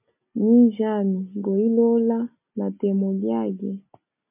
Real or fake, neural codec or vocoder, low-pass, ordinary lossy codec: real; none; 3.6 kHz; MP3, 32 kbps